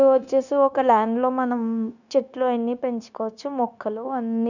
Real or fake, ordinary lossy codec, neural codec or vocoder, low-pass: fake; none; codec, 16 kHz, 0.9 kbps, LongCat-Audio-Codec; 7.2 kHz